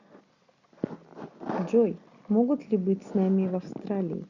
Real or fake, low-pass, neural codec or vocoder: real; 7.2 kHz; none